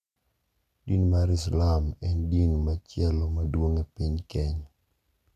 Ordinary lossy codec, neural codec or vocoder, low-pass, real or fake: none; none; 14.4 kHz; real